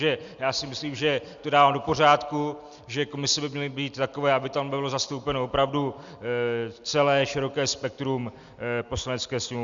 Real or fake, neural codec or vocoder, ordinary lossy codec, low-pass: real; none; Opus, 64 kbps; 7.2 kHz